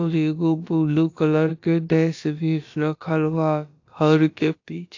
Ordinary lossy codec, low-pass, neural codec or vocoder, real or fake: AAC, 48 kbps; 7.2 kHz; codec, 16 kHz, about 1 kbps, DyCAST, with the encoder's durations; fake